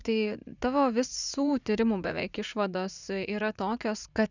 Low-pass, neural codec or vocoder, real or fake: 7.2 kHz; vocoder, 44.1 kHz, 80 mel bands, Vocos; fake